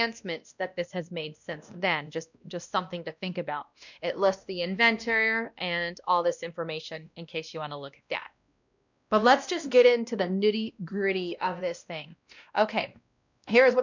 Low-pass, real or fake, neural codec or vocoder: 7.2 kHz; fake; codec, 16 kHz, 1 kbps, X-Codec, WavLM features, trained on Multilingual LibriSpeech